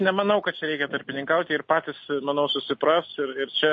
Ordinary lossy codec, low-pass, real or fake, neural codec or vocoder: MP3, 32 kbps; 7.2 kHz; real; none